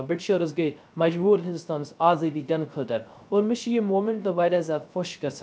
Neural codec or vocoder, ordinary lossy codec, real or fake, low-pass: codec, 16 kHz, 0.3 kbps, FocalCodec; none; fake; none